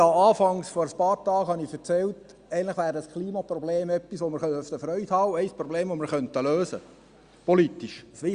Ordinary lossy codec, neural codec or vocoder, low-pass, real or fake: Opus, 64 kbps; none; 9.9 kHz; real